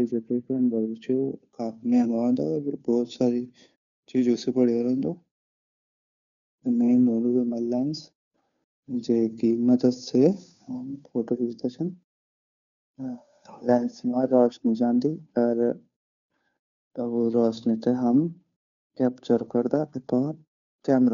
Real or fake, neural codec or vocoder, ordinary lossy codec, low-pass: fake; codec, 16 kHz, 2 kbps, FunCodec, trained on Chinese and English, 25 frames a second; none; 7.2 kHz